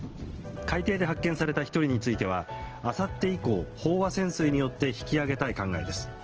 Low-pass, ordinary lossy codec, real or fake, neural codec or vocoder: 7.2 kHz; Opus, 16 kbps; real; none